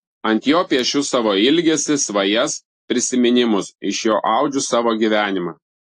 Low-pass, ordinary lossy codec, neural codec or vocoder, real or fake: 10.8 kHz; AAC, 48 kbps; none; real